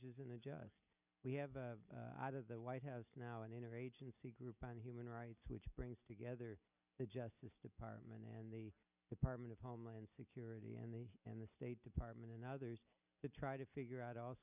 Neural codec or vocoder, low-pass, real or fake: none; 3.6 kHz; real